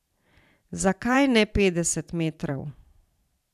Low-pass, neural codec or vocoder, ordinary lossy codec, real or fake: 14.4 kHz; vocoder, 44.1 kHz, 128 mel bands every 512 samples, BigVGAN v2; none; fake